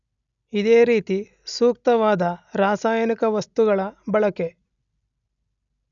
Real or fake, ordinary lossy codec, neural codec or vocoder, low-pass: real; none; none; 7.2 kHz